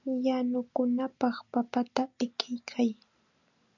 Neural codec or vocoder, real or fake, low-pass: none; real; 7.2 kHz